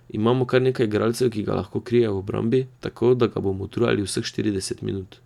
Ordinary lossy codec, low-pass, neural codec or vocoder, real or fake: none; 19.8 kHz; none; real